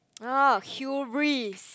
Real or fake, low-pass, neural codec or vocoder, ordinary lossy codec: real; none; none; none